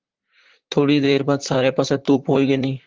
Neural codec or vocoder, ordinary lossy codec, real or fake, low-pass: vocoder, 44.1 kHz, 128 mel bands, Pupu-Vocoder; Opus, 16 kbps; fake; 7.2 kHz